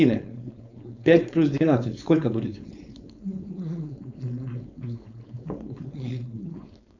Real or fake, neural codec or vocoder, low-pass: fake; codec, 16 kHz, 4.8 kbps, FACodec; 7.2 kHz